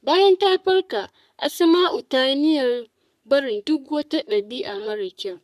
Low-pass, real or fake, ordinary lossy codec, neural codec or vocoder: 14.4 kHz; fake; none; codec, 44.1 kHz, 3.4 kbps, Pupu-Codec